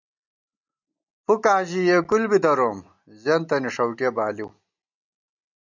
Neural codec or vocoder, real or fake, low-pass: none; real; 7.2 kHz